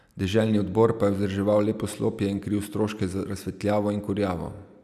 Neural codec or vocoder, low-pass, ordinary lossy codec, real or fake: none; 14.4 kHz; none; real